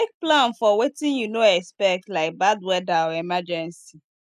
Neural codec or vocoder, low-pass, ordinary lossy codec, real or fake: none; 14.4 kHz; none; real